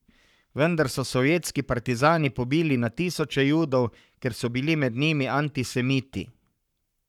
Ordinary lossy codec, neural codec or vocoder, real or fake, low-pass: none; codec, 44.1 kHz, 7.8 kbps, Pupu-Codec; fake; 19.8 kHz